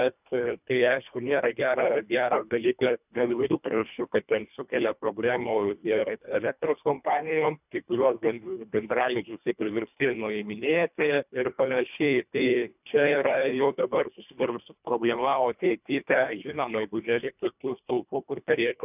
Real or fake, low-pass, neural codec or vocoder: fake; 3.6 kHz; codec, 24 kHz, 1.5 kbps, HILCodec